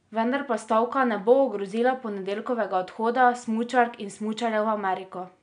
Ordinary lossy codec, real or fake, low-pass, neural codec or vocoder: none; real; 9.9 kHz; none